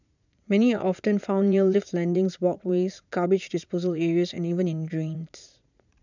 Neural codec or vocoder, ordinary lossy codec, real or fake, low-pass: vocoder, 44.1 kHz, 80 mel bands, Vocos; none; fake; 7.2 kHz